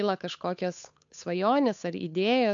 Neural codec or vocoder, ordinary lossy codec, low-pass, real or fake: codec, 16 kHz, 8 kbps, FunCodec, trained on Chinese and English, 25 frames a second; MP3, 64 kbps; 7.2 kHz; fake